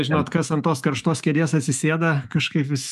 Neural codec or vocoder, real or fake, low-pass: autoencoder, 48 kHz, 128 numbers a frame, DAC-VAE, trained on Japanese speech; fake; 14.4 kHz